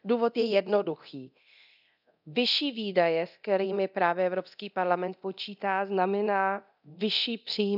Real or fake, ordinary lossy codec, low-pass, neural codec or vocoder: fake; none; 5.4 kHz; codec, 24 kHz, 0.9 kbps, DualCodec